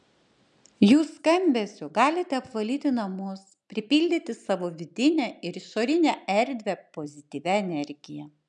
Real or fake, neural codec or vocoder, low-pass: real; none; 10.8 kHz